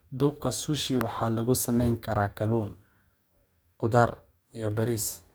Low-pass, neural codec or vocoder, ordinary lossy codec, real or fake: none; codec, 44.1 kHz, 2.6 kbps, DAC; none; fake